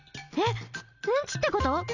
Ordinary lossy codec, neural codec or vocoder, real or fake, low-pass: none; none; real; 7.2 kHz